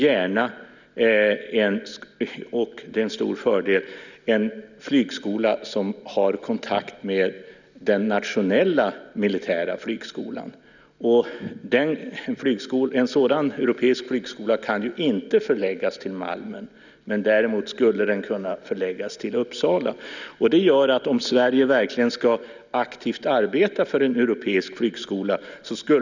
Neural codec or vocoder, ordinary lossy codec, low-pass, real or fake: none; none; 7.2 kHz; real